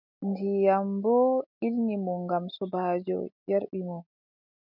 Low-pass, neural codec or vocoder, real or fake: 5.4 kHz; none; real